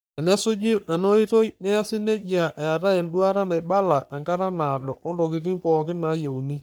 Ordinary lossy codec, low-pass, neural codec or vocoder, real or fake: none; none; codec, 44.1 kHz, 3.4 kbps, Pupu-Codec; fake